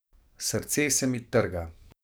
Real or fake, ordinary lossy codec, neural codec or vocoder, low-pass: fake; none; codec, 44.1 kHz, 7.8 kbps, DAC; none